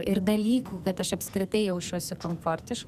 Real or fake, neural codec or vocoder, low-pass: fake; codec, 44.1 kHz, 2.6 kbps, SNAC; 14.4 kHz